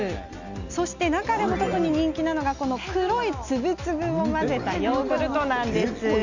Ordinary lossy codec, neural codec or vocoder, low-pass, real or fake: Opus, 64 kbps; none; 7.2 kHz; real